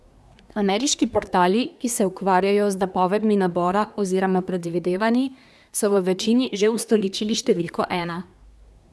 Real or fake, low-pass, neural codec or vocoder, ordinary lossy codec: fake; none; codec, 24 kHz, 1 kbps, SNAC; none